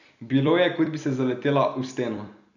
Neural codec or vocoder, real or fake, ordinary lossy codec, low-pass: vocoder, 44.1 kHz, 128 mel bands every 256 samples, BigVGAN v2; fake; none; 7.2 kHz